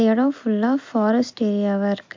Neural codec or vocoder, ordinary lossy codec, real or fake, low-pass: none; MP3, 48 kbps; real; 7.2 kHz